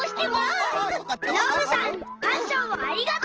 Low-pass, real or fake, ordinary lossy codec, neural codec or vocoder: 7.2 kHz; real; Opus, 16 kbps; none